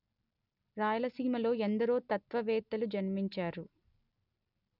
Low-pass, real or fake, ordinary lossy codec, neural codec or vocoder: 5.4 kHz; real; none; none